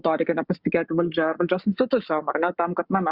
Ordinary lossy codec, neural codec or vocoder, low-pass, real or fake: Opus, 64 kbps; codec, 44.1 kHz, 7.8 kbps, Pupu-Codec; 5.4 kHz; fake